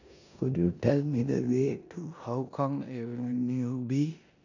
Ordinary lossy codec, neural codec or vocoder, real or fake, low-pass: none; codec, 16 kHz in and 24 kHz out, 0.9 kbps, LongCat-Audio-Codec, four codebook decoder; fake; 7.2 kHz